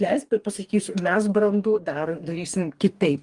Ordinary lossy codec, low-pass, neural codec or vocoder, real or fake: Opus, 32 kbps; 10.8 kHz; codec, 44.1 kHz, 2.6 kbps, DAC; fake